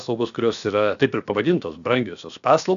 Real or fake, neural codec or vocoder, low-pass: fake; codec, 16 kHz, 0.7 kbps, FocalCodec; 7.2 kHz